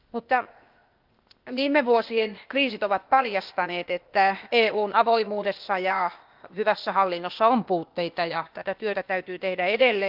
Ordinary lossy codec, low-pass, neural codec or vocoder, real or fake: Opus, 24 kbps; 5.4 kHz; codec, 16 kHz, 0.8 kbps, ZipCodec; fake